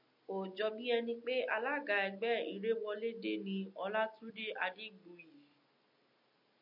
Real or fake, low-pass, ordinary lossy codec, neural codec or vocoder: real; 5.4 kHz; MP3, 48 kbps; none